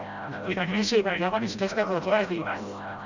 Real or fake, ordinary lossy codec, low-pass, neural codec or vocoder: fake; none; 7.2 kHz; codec, 16 kHz, 0.5 kbps, FreqCodec, smaller model